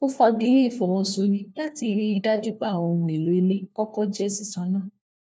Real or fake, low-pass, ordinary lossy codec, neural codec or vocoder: fake; none; none; codec, 16 kHz, 1 kbps, FunCodec, trained on LibriTTS, 50 frames a second